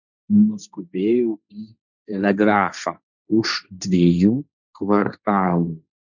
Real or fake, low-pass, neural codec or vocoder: fake; 7.2 kHz; codec, 16 kHz, 1.1 kbps, Voila-Tokenizer